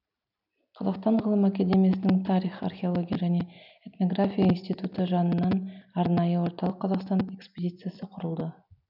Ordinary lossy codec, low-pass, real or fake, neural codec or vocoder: none; 5.4 kHz; real; none